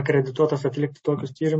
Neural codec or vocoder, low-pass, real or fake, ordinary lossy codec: none; 9.9 kHz; real; MP3, 32 kbps